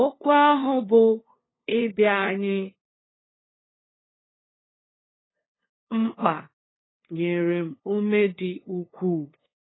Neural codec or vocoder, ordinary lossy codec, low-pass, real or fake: codec, 16 kHz, 2 kbps, FunCodec, trained on LibriTTS, 25 frames a second; AAC, 16 kbps; 7.2 kHz; fake